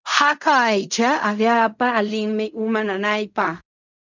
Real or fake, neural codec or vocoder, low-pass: fake; codec, 16 kHz in and 24 kHz out, 0.4 kbps, LongCat-Audio-Codec, fine tuned four codebook decoder; 7.2 kHz